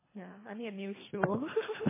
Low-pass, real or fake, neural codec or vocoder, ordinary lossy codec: 3.6 kHz; fake; codec, 24 kHz, 3 kbps, HILCodec; MP3, 16 kbps